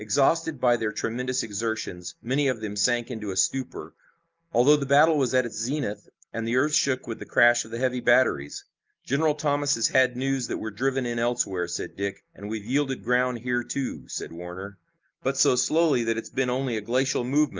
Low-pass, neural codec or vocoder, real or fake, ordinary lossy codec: 7.2 kHz; none; real; Opus, 24 kbps